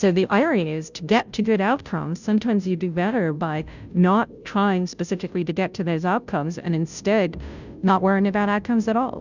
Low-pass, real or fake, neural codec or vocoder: 7.2 kHz; fake; codec, 16 kHz, 0.5 kbps, FunCodec, trained on Chinese and English, 25 frames a second